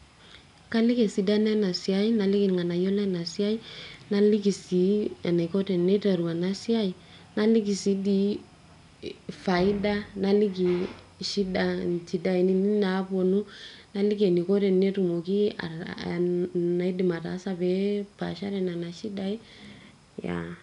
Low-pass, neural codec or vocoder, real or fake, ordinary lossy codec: 10.8 kHz; none; real; none